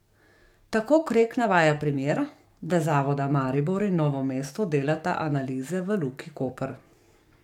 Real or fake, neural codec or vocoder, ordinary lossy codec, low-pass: fake; codec, 44.1 kHz, 7.8 kbps, DAC; MP3, 96 kbps; 19.8 kHz